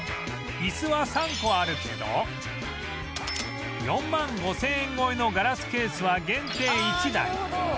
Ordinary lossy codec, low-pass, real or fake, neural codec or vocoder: none; none; real; none